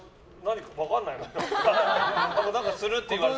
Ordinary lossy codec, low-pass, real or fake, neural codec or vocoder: none; none; real; none